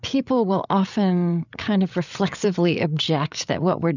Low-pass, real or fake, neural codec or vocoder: 7.2 kHz; fake; codec, 16 kHz, 8 kbps, FreqCodec, larger model